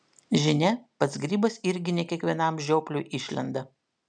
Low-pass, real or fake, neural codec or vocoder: 9.9 kHz; real; none